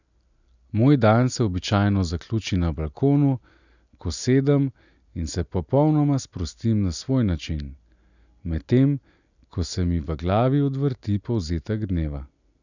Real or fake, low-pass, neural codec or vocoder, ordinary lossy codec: real; 7.2 kHz; none; none